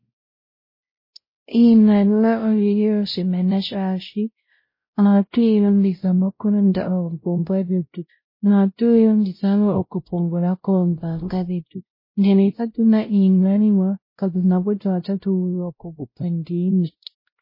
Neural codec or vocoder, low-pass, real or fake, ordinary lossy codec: codec, 16 kHz, 0.5 kbps, X-Codec, WavLM features, trained on Multilingual LibriSpeech; 5.4 kHz; fake; MP3, 24 kbps